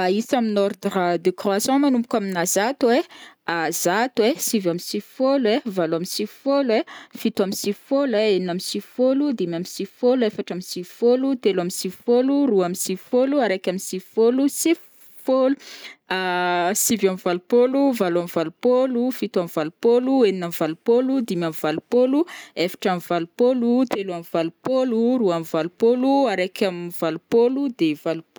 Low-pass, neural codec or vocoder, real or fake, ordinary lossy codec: none; none; real; none